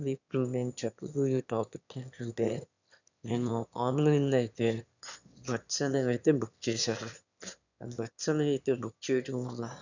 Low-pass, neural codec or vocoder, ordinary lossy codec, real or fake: 7.2 kHz; autoencoder, 22.05 kHz, a latent of 192 numbers a frame, VITS, trained on one speaker; none; fake